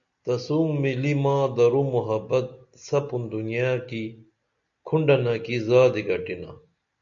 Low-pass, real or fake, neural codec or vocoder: 7.2 kHz; real; none